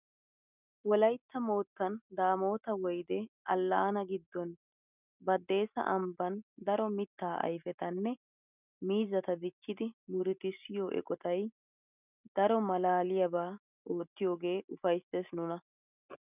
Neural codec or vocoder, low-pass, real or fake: none; 3.6 kHz; real